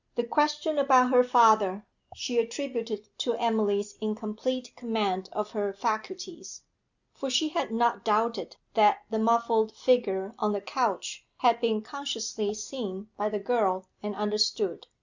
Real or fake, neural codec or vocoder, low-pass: real; none; 7.2 kHz